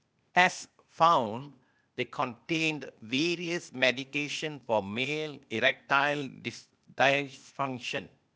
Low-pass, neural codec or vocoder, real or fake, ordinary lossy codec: none; codec, 16 kHz, 0.8 kbps, ZipCodec; fake; none